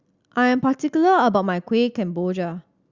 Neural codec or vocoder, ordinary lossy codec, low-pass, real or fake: none; Opus, 64 kbps; 7.2 kHz; real